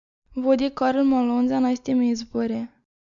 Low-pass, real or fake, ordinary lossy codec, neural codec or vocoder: 7.2 kHz; real; MP3, 64 kbps; none